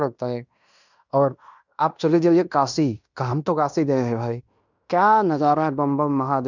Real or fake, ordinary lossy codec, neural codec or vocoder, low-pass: fake; none; codec, 16 kHz in and 24 kHz out, 0.9 kbps, LongCat-Audio-Codec, fine tuned four codebook decoder; 7.2 kHz